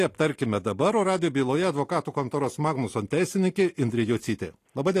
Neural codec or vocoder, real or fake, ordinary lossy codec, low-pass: none; real; AAC, 48 kbps; 14.4 kHz